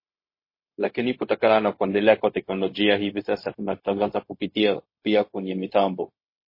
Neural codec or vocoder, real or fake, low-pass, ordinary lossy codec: codec, 16 kHz, 0.4 kbps, LongCat-Audio-Codec; fake; 5.4 kHz; MP3, 24 kbps